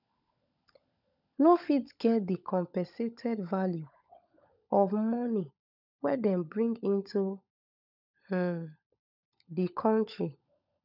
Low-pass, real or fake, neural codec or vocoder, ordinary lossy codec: 5.4 kHz; fake; codec, 16 kHz, 16 kbps, FunCodec, trained on LibriTTS, 50 frames a second; none